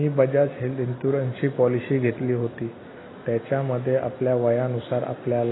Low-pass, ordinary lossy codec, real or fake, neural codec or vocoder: 7.2 kHz; AAC, 16 kbps; real; none